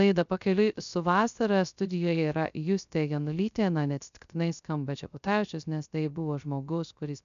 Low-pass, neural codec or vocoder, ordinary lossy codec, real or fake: 7.2 kHz; codec, 16 kHz, 0.3 kbps, FocalCodec; MP3, 96 kbps; fake